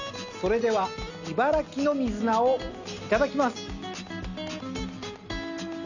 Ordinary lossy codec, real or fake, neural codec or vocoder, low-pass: none; real; none; 7.2 kHz